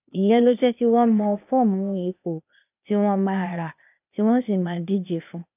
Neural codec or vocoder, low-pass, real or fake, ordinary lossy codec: codec, 16 kHz, 0.8 kbps, ZipCodec; 3.6 kHz; fake; none